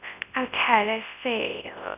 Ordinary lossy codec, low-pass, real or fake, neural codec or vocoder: none; 3.6 kHz; fake; codec, 24 kHz, 0.9 kbps, WavTokenizer, large speech release